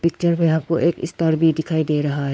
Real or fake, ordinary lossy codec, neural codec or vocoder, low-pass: fake; none; codec, 16 kHz, 4 kbps, X-Codec, WavLM features, trained on Multilingual LibriSpeech; none